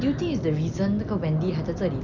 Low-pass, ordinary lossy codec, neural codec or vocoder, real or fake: 7.2 kHz; none; none; real